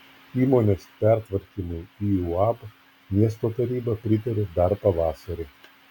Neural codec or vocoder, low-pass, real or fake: none; 19.8 kHz; real